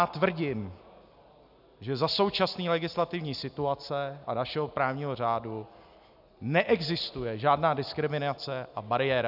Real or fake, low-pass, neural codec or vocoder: real; 5.4 kHz; none